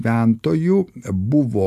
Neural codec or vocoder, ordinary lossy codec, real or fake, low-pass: none; AAC, 96 kbps; real; 14.4 kHz